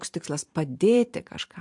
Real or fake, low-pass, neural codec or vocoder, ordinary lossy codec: fake; 10.8 kHz; vocoder, 44.1 kHz, 128 mel bands every 512 samples, BigVGAN v2; MP3, 64 kbps